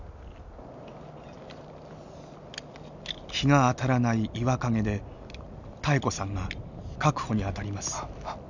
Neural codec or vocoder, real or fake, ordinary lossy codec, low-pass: none; real; none; 7.2 kHz